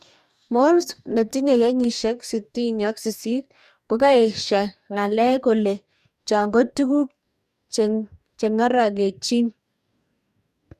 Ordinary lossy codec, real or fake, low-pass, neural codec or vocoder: none; fake; 14.4 kHz; codec, 44.1 kHz, 2.6 kbps, DAC